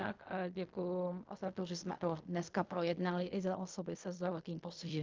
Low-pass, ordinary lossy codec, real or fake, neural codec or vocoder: 7.2 kHz; Opus, 24 kbps; fake; codec, 16 kHz in and 24 kHz out, 0.4 kbps, LongCat-Audio-Codec, fine tuned four codebook decoder